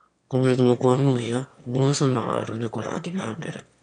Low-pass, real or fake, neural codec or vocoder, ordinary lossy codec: 9.9 kHz; fake; autoencoder, 22.05 kHz, a latent of 192 numbers a frame, VITS, trained on one speaker; none